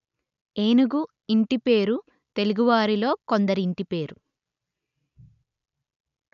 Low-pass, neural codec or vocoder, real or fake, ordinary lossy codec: 7.2 kHz; none; real; none